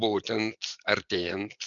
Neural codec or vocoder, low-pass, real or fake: none; 7.2 kHz; real